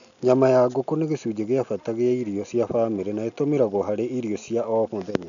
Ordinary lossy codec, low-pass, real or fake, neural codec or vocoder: none; 7.2 kHz; real; none